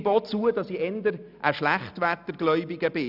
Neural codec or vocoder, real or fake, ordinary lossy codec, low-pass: none; real; none; 5.4 kHz